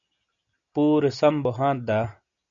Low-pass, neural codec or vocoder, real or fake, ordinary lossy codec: 7.2 kHz; none; real; AAC, 64 kbps